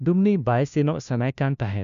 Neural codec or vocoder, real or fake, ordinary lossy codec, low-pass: codec, 16 kHz, 1 kbps, FunCodec, trained on LibriTTS, 50 frames a second; fake; MP3, 96 kbps; 7.2 kHz